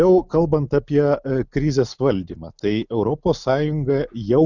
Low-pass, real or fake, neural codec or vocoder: 7.2 kHz; real; none